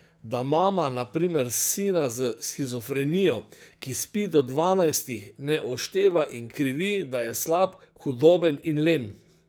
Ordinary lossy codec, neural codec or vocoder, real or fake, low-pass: none; codec, 44.1 kHz, 2.6 kbps, SNAC; fake; none